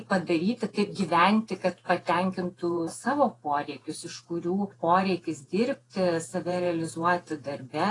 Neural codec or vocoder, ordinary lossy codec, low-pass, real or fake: vocoder, 48 kHz, 128 mel bands, Vocos; AAC, 32 kbps; 10.8 kHz; fake